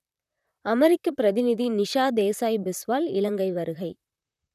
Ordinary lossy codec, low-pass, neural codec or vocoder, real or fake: none; 14.4 kHz; vocoder, 44.1 kHz, 128 mel bands, Pupu-Vocoder; fake